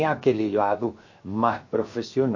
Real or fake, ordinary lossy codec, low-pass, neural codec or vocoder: fake; MP3, 32 kbps; 7.2 kHz; codec, 16 kHz, 0.7 kbps, FocalCodec